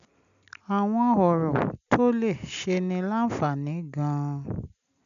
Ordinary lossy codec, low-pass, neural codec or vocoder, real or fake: AAC, 96 kbps; 7.2 kHz; none; real